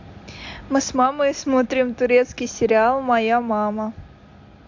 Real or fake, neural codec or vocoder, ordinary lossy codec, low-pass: real; none; AAC, 48 kbps; 7.2 kHz